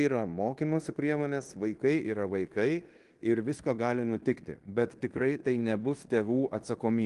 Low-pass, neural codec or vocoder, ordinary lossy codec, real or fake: 10.8 kHz; codec, 16 kHz in and 24 kHz out, 0.9 kbps, LongCat-Audio-Codec, fine tuned four codebook decoder; Opus, 24 kbps; fake